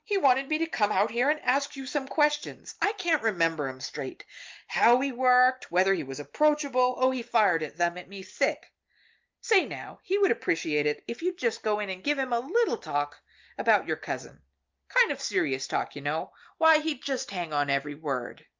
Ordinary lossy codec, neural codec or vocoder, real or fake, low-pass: Opus, 24 kbps; none; real; 7.2 kHz